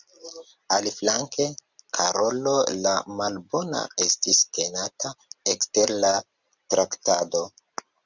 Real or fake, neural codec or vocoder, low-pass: real; none; 7.2 kHz